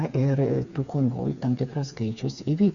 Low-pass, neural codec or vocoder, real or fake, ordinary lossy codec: 7.2 kHz; codec, 16 kHz, 4 kbps, FreqCodec, smaller model; fake; Opus, 64 kbps